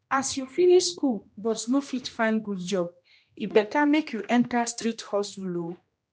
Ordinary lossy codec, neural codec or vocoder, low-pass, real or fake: none; codec, 16 kHz, 1 kbps, X-Codec, HuBERT features, trained on general audio; none; fake